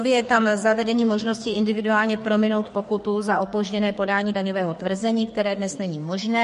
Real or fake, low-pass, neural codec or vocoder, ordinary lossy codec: fake; 14.4 kHz; codec, 44.1 kHz, 2.6 kbps, SNAC; MP3, 48 kbps